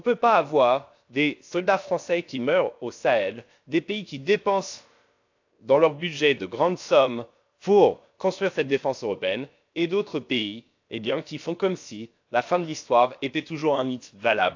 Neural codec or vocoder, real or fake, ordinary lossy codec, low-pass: codec, 16 kHz, about 1 kbps, DyCAST, with the encoder's durations; fake; AAC, 48 kbps; 7.2 kHz